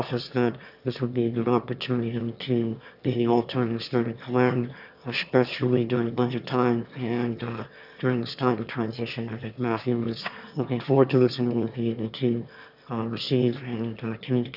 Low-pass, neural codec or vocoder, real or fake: 5.4 kHz; autoencoder, 22.05 kHz, a latent of 192 numbers a frame, VITS, trained on one speaker; fake